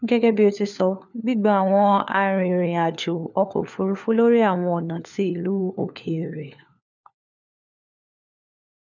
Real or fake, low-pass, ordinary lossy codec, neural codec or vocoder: fake; 7.2 kHz; none; codec, 16 kHz, 4 kbps, FunCodec, trained on LibriTTS, 50 frames a second